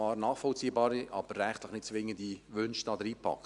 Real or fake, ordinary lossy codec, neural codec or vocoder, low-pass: real; none; none; 10.8 kHz